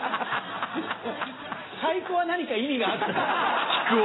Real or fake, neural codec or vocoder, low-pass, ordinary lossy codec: real; none; 7.2 kHz; AAC, 16 kbps